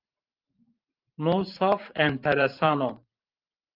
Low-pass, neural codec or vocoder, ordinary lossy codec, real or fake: 5.4 kHz; vocoder, 44.1 kHz, 128 mel bands every 512 samples, BigVGAN v2; Opus, 32 kbps; fake